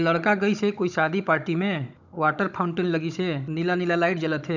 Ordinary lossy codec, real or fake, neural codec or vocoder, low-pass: none; fake; codec, 16 kHz, 16 kbps, FunCodec, trained on Chinese and English, 50 frames a second; 7.2 kHz